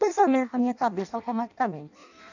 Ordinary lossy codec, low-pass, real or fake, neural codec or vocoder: none; 7.2 kHz; fake; codec, 16 kHz in and 24 kHz out, 0.6 kbps, FireRedTTS-2 codec